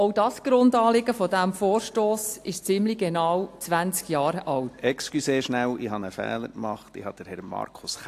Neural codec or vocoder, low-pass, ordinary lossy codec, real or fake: none; 14.4 kHz; AAC, 64 kbps; real